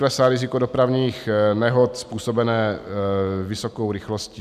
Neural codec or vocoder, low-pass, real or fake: none; 14.4 kHz; real